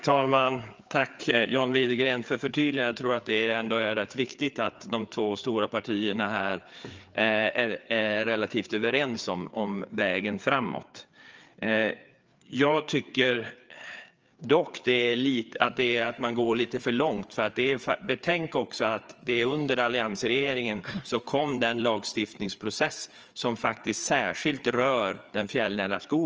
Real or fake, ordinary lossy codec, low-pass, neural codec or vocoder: fake; Opus, 24 kbps; 7.2 kHz; codec, 16 kHz, 4 kbps, FreqCodec, larger model